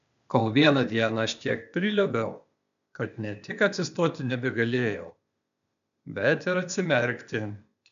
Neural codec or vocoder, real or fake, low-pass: codec, 16 kHz, 0.8 kbps, ZipCodec; fake; 7.2 kHz